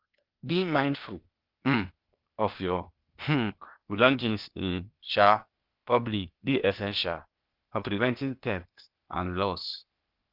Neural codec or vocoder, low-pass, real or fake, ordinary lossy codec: codec, 16 kHz, 0.8 kbps, ZipCodec; 5.4 kHz; fake; Opus, 24 kbps